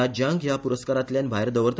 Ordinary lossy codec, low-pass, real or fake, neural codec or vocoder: none; none; real; none